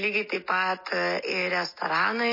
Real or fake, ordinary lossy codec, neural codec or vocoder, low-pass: real; MP3, 24 kbps; none; 5.4 kHz